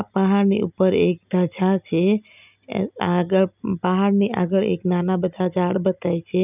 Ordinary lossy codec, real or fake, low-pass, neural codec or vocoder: none; real; 3.6 kHz; none